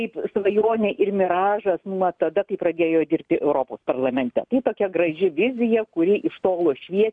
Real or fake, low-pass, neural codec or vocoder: real; 9.9 kHz; none